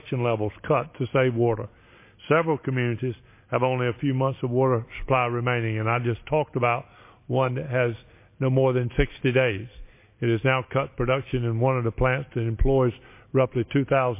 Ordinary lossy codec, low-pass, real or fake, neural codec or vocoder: MP3, 24 kbps; 3.6 kHz; real; none